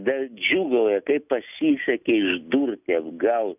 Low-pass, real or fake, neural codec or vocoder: 3.6 kHz; real; none